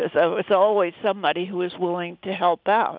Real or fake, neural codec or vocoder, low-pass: real; none; 5.4 kHz